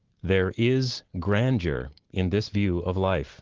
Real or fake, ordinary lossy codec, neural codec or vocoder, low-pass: real; Opus, 16 kbps; none; 7.2 kHz